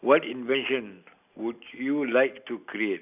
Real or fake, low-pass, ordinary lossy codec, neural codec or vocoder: real; 3.6 kHz; none; none